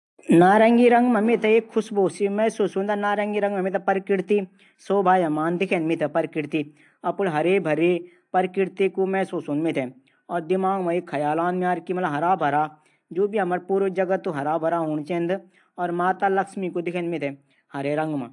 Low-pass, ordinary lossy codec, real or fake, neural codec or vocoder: 10.8 kHz; AAC, 64 kbps; real; none